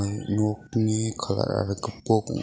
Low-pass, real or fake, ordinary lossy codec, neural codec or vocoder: none; real; none; none